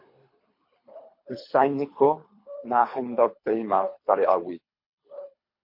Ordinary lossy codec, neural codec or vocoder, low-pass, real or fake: MP3, 32 kbps; codec, 24 kHz, 3 kbps, HILCodec; 5.4 kHz; fake